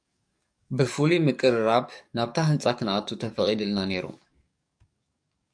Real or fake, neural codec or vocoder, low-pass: fake; codec, 44.1 kHz, 7.8 kbps, DAC; 9.9 kHz